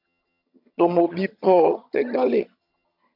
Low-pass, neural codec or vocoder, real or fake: 5.4 kHz; vocoder, 22.05 kHz, 80 mel bands, HiFi-GAN; fake